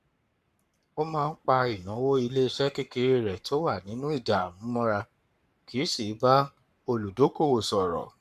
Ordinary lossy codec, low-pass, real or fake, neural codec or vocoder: none; 14.4 kHz; fake; codec, 44.1 kHz, 7.8 kbps, Pupu-Codec